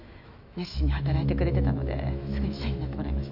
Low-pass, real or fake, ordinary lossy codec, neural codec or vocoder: 5.4 kHz; fake; none; autoencoder, 48 kHz, 128 numbers a frame, DAC-VAE, trained on Japanese speech